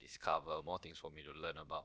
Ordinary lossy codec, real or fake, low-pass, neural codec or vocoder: none; fake; none; codec, 16 kHz, about 1 kbps, DyCAST, with the encoder's durations